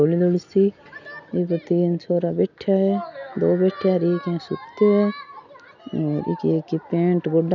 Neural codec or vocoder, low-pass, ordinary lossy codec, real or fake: none; 7.2 kHz; none; real